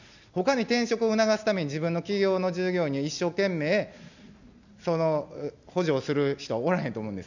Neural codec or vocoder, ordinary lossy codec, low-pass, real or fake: none; none; 7.2 kHz; real